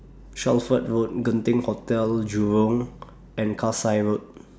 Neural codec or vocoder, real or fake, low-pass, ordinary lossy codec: none; real; none; none